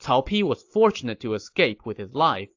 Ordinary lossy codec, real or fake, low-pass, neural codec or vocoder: AAC, 48 kbps; real; 7.2 kHz; none